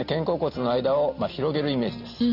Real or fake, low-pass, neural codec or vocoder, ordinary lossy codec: real; 5.4 kHz; none; none